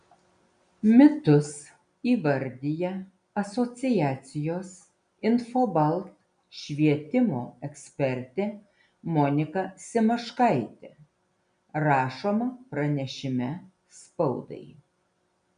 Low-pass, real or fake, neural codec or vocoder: 9.9 kHz; real; none